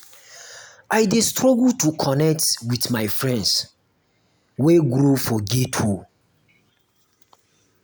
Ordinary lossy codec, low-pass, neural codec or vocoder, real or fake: none; none; none; real